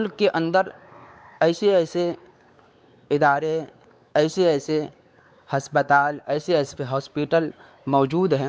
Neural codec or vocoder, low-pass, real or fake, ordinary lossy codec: codec, 16 kHz, 4 kbps, X-Codec, WavLM features, trained on Multilingual LibriSpeech; none; fake; none